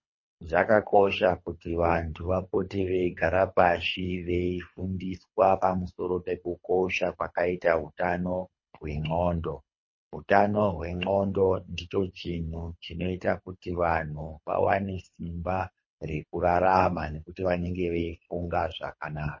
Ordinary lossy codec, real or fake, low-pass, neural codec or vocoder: MP3, 32 kbps; fake; 7.2 kHz; codec, 24 kHz, 3 kbps, HILCodec